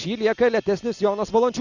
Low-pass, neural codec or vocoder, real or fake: 7.2 kHz; none; real